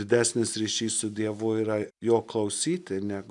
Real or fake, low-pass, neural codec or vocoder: real; 10.8 kHz; none